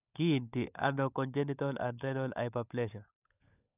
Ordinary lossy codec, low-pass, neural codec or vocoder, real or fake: none; 3.6 kHz; codec, 16 kHz, 16 kbps, FunCodec, trained on LibriTTS, 50 frames a second; fake